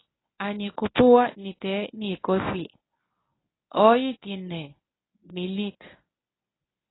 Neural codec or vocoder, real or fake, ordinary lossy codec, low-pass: codec, 24 kHz, 0.9 kbps, WavTokenizer, medium speech release version 1; fake; AAC, 16 kbps; 7.2 kHz